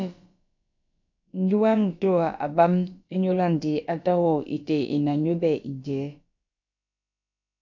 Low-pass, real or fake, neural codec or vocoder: 7.2 kHz; fake; codec, 16 kHz, about 1 kbps, DyCAST, with the encoder's durations